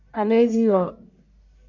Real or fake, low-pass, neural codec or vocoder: fake; 7.2 kHz; codec, 44.1 kHz, 1.7 kbps, Pupu-Codec